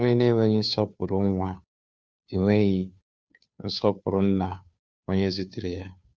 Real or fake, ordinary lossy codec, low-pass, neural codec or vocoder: fake; none; none; codec, 16 kHz, 2 kbps, FunCodec, trained on Chinese and English, 25 frames a second